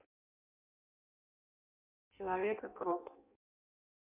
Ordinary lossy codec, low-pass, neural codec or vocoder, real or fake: MP3, 32 kbps; 3.6 kHz; codec, 16 kHz in and 24 kHz out, 0.6 kbps, FireRedTTS-2 codec; fake